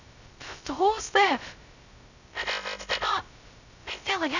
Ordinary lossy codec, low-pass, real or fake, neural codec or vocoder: none; 7.2 kHz; fake; codec, 16 kHz, 0.2 kbps, FocalCodec